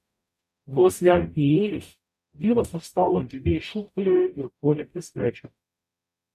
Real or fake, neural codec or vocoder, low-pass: fake; codec, 44.1 kHz, 0.9 kbps, DAC; 14.4 kHz